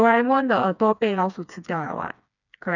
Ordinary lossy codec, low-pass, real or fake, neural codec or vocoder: none; 7.2 kHz; fake; codec, 16 kHz, 2 kbps, FreqCodec, smaller model